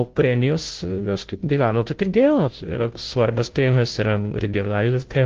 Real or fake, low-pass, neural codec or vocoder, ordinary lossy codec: fake; 7.2 kHz; codec, 16 kHz, 0.5 kbps, FunCodec, trained on Chinese and English, 25 frames a second; Opus, 16 kbps